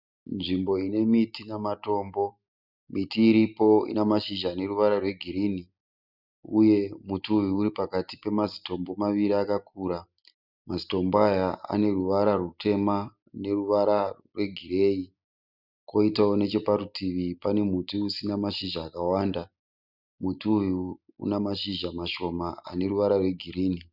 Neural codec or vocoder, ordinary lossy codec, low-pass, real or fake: none; Opus, 64 kbps; 5.4 kHz; real